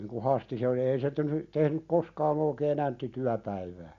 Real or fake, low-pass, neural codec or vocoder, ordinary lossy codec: real; 7.2 kHz; none; Opus, 64 kbps